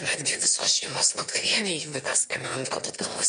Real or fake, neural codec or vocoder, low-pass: fake; autoencoder, 22.05 kHz, a latent of 192 numbers a frame, VITS, trained on one speaker; 9.9 kHz